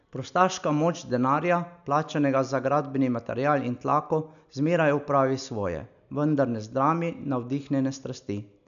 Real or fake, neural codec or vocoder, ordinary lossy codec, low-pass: real; none; none; 7.2 kHz